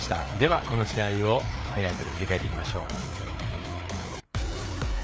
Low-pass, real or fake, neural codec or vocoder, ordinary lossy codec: none; fake; codec, 16 kHz, 4 kbps, FreqCodec, larger model; none